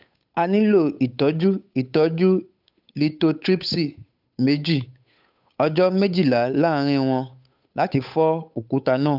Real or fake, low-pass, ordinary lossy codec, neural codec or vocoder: fake; 5.4 kHz; none; codec, 16 kHz, 8 kbps, FunCodec, trained on Chinese and English, 25 frames a second